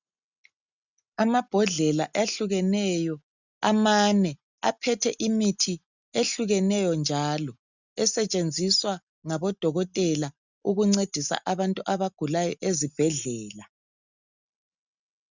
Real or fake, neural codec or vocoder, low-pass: real; none; 7.2 kHz